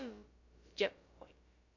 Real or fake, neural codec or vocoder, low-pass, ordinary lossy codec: fake; codec, 16 kHz, about 1 kbps, DyCAST, with the encoder's durations; 7.2 kHz; none